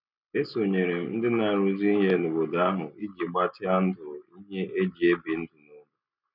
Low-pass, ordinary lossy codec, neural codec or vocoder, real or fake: 5.4 kHz; none; none; real